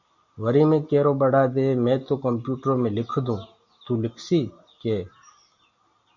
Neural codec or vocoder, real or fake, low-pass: none; real; 7.2 kHz